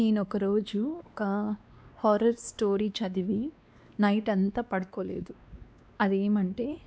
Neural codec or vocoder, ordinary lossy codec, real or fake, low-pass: codec, 16 kHz, 2 kbps, X-Codec, WavLM features, trained on Multilingual LibriSpeech; none; fake; none